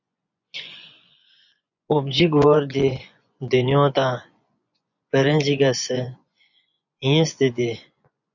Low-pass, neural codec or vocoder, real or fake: 7.2 kHz; vocoder, 24 kHz, 100 mel bands, Vocos; fake